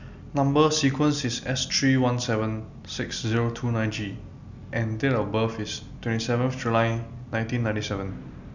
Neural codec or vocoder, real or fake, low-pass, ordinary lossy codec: none; real; 7.2 kHz; none